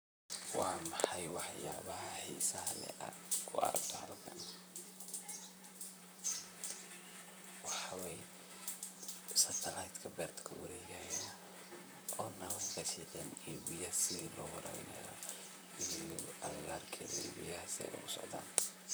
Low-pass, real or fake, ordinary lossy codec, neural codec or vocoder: none; fake; none; vocoder, 44.1 kHz, 128 mel bands, Pupu-Vocoder